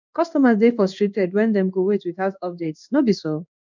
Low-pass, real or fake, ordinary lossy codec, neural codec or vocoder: 7.2 kHz; fake; none; codec, 16 kHz in and 24 kHz out, 1 kbps, XY-Tokenizer